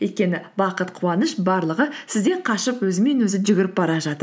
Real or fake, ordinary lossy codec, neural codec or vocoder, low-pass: real; none; none; none